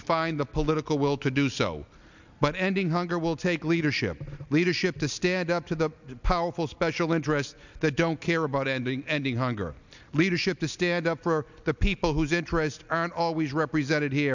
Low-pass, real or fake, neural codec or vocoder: 7.2 kHz; real; none